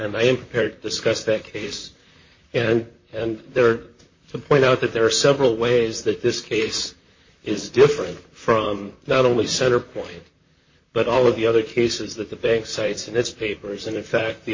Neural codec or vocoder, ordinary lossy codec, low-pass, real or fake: vocoder, 44.1 kHz, 128 mel bands, Pupu-Vocoder; MP3, 32 kbps; 7.2 kHz; fake